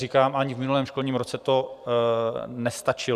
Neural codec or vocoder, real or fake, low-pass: vocoder, 44.1 kHz, 128 mel bands every 512 samples, BigVGAN v2; fake; 14.4 kHz